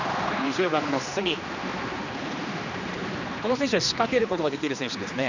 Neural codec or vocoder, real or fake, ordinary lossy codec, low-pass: codec, 16 kHz, 2 kbps, X-Codec, HuBERT features, trained on general audio; fake; none; 7.2 kHz